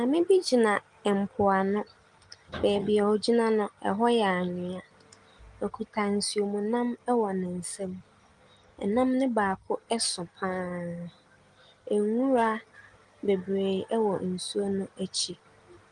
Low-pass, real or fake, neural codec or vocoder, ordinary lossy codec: 10.8 kHz; real; none; Opus, 24 kbps